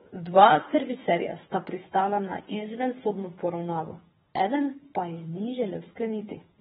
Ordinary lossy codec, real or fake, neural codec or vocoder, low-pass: AAC, 16 kbps; fake; codec, 24 kHz, 3 kbps, HILCodec; 10.8 kHz